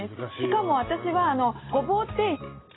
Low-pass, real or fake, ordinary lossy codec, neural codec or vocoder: 7.2 kHz; real; AAC, 16 kbps; none